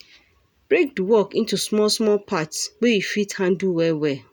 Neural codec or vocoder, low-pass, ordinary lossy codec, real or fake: none; none; none; real